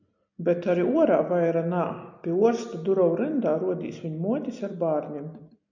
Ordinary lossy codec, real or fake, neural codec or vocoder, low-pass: MP3, 64 kbps; real; none; 7.2 kHz